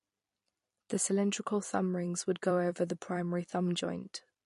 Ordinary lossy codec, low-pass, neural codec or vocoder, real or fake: MP3, 48 kbps; 14.4 kHz; vocoder, 44.1 kHz, 128 mel bands every 256 samples, BigVGAN v2; fake